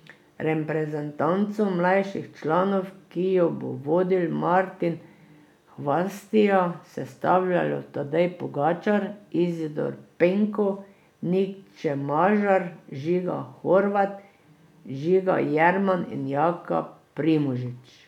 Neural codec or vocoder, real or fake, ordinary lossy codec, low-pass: none; real; none; 19.8 kHz